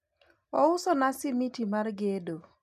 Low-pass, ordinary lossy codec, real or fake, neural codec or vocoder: 14.4 kHz; MP3, 96 kbps; real; none